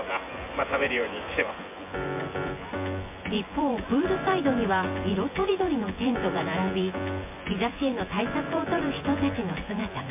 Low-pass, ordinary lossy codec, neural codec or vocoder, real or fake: 3.6 kHz; MP3, 24 kbps; vocoder, 24 kHz, 100 mel bands, Vocos; fake